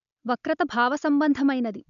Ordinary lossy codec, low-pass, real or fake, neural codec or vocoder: none; 7.2 kHz; real; none